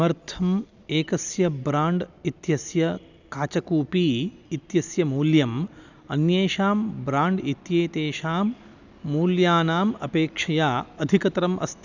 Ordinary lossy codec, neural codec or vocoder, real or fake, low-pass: none; none; real; 7.2 kHz